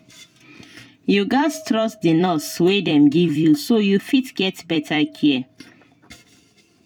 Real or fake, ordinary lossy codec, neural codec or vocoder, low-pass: fake; none; vocoder, 44.1 kHz, 128 mel bands every 256 samples, BigVGAN v2; 19.8 kHz